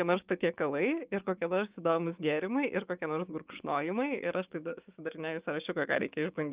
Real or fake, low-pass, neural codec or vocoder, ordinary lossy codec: fake; 3.6 kHz; codec, 16 kHz, 6 kbps, DAC; Opus, 24 kbps